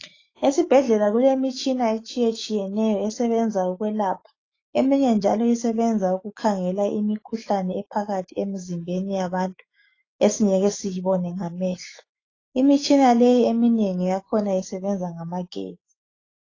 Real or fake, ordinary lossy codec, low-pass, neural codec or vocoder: fake; AAC, 32 kbps; 7.2 kHz; autoencoder, 48 kHz, 128 numbers a frame, DAC-VAE, trained on Japanese speech